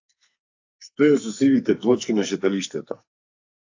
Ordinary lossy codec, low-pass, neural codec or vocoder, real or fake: AAC, 48 kbps; 7.2 kHz; codec, 44.1 kHz, 2.6 kbps, SNAC; fake